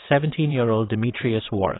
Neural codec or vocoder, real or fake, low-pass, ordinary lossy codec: vocoder, 22.05 kHz, 80 mel bands, WaveNeXt; fake; 7.2 kHz; AAC, 16 kbps